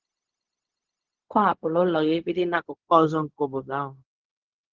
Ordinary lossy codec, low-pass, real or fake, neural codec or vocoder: Opus, 16 kbps; 7.2 kHz; fake; codec, 16 kHz, 0.4 kbps, LongCat-Audio-Codec